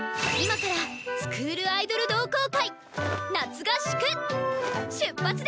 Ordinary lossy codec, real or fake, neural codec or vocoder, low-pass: none; real; none; none